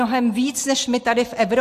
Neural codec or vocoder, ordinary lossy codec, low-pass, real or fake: none; AAC, 64 kbps; 14.4 kHz; real